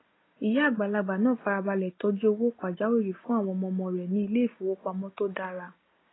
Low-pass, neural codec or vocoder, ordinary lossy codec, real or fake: 7.2 kHz; none; AAC, 16 kbps; real